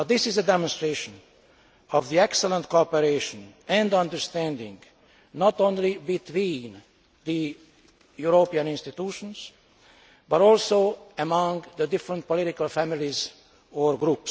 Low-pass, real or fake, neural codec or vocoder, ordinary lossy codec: none; real; none; none